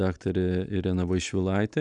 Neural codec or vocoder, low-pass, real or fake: none; 9.9 kHz; real